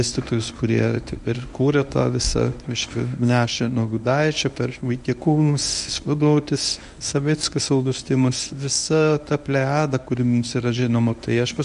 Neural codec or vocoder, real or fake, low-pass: codec, 24 kHz, 0.9 kbps, WavTokenizer, medium speech release version 1; fake; 10.8 kHz